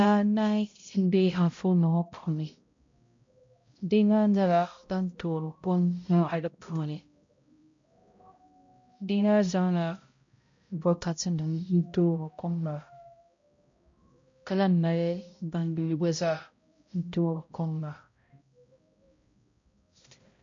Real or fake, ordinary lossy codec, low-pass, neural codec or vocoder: fake; MP3, 48 kbps; 7.2 kHz; codec, 16 kHz, 0.5 kbps, X-Codec, HuBERT features, trained on balanced general audio